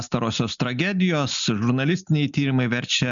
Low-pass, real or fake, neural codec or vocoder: 7.2 kHz; real; none